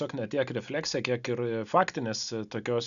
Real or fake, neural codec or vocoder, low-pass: real; none; 7.2 kHz